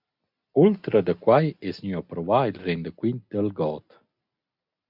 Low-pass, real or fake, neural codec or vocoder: 5.4 kHz; real; none